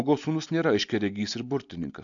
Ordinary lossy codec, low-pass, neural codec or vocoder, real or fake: AAC, 64 kbps; 7.2 kHz; none; real